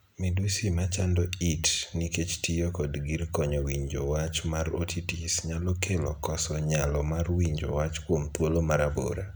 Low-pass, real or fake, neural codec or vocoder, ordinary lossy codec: none; real; none; none